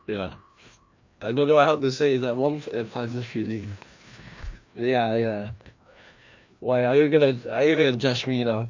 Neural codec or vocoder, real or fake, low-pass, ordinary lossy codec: codec, 16 kHz, 1 kbps, FreqCodec, larger model; fake; 7.2 kHz; MP3, 64 kbps